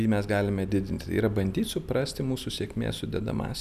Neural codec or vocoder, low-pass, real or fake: none; 14.4 kHz; real